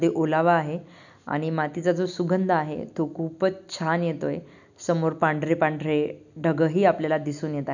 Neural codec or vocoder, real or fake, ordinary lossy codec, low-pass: none; real; none; 7.2 kHz